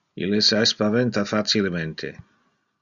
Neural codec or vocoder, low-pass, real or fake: none; 7.2 kHz; real